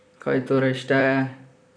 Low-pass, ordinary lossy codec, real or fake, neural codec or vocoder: 9.9 kHz; none; fake; vocoder, 44.1 kHz, 128 mel bands, Pupu-Vocoder